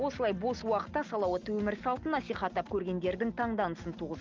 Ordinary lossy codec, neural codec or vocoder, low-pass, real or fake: Opus, 24 kbps; none; 7.2 kHz; real